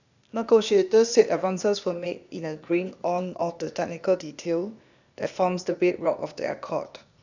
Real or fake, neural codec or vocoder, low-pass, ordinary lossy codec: fake; codec, 16 kHz, 0.8 kbps, ZipCodec; 7.2 kHz; none